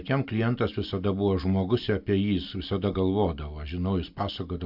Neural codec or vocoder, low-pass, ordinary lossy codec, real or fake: none; 5.4 kHz; AAC, 48 kbps; real